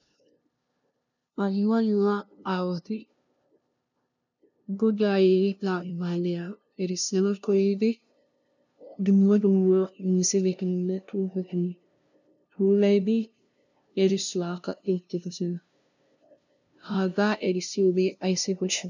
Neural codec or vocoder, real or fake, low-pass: codec, 16 kHz, 0.5 kbps, FunCodec, trained on LibriTTS, 25 frames a second; fake; 7.2 kHz